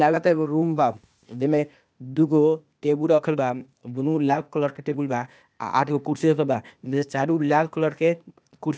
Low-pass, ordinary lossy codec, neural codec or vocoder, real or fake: none; none; codec, 16 kHz, 0.8 kbps, ZipCodec; fake